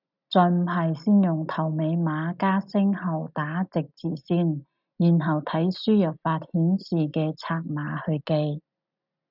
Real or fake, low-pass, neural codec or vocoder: real; 5.4 kHz; none